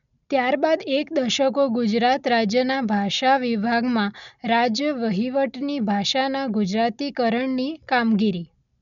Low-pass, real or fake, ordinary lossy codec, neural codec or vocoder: 7.2 kHz; real; none; none